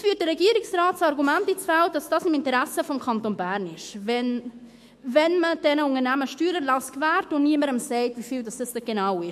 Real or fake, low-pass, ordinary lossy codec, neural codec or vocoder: fake; 14.4 kHz; MP3, 64 kbps; autoencoder, 48 kHz, 128 numbers a frame, DAC-VAE, trained on Japanese speech